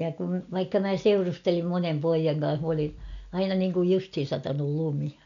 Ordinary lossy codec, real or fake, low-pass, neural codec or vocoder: none; real; 7.2 kHz; none